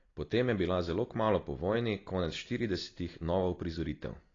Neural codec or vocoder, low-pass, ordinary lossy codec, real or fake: none; 7.2 kHz; AAC, 32 kbps; real